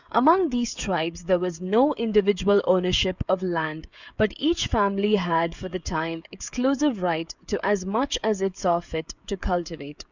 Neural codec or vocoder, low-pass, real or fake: codec, 16 kHz, 16 kbps, FreqCodec, smaller model; 7.2 kHz; fake